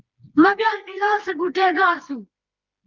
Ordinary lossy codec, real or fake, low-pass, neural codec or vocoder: Opus, 32 kbps; fake; 7.2 kHz; codec, 16 kHz, 2 kbps, FreqCodec, smaller model